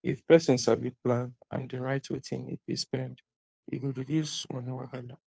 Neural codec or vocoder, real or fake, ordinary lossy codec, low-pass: codec, 16 kHz, 2 kbps, FunCodec, trained on Chinese and English, 25 frames a second; fake; none; none